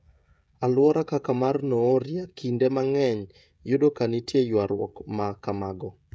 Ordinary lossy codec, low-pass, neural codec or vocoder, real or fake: none; none; codec, 16 kHz, 16 kbps, FreqCodec, smaller model; fake